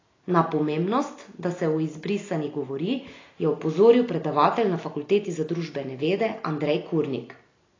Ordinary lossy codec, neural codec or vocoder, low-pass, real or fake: AAC, 32 kbps; none; 7.2 kHz; real